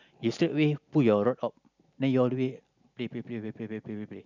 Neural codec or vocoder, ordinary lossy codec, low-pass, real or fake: none; none; 7.2 kHz; real